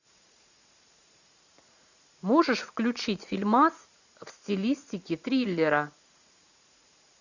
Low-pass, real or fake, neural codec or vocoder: 7.2 kHz; fake; vocoder, 44.1 kHz, 80 mel bands, Vocos